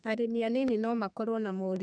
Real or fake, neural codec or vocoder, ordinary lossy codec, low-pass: fake; codec, 32 kHz, 1.9 kbps, SNAC; none; 9.9 kHz